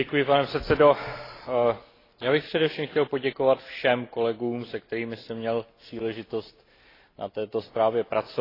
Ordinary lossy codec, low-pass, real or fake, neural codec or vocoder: AAC, 24 kbps; 5.4 kHz; real; none